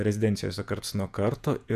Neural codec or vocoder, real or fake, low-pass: vocoder, 48 kHz, 128 mel bands, Vocos; fake; 14.4 kHz